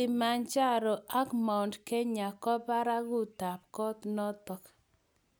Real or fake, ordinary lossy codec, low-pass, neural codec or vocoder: real; none; none; none